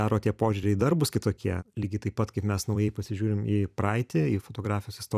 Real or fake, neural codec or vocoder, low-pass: fake; vocoder, 44.1 kHz, 128 mel bands every 256 samples, BigVGAN v2; 14.4 kHz